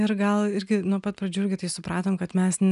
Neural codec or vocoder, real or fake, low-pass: none; real; 10.8 kHz